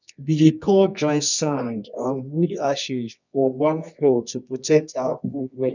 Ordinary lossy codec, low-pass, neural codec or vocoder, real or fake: none; 7.2 kHz; codec, 24 kHz, 0.9 kbps, WavTokenizer, medium music audio release; fake